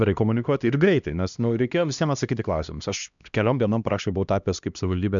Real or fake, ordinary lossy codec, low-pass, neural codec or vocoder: fake; MP3, 96 kbps; 7.2 kHz; codec, 16 kHz, 1 kbps, X-Codec, HuBERT features, trained on LibriSpeech